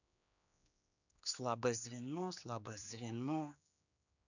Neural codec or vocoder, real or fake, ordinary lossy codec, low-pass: codec, 16 kHz, 2 kbps, X-Codec, HuBERT features, trained on general audio; fake; none; 7.2 kHz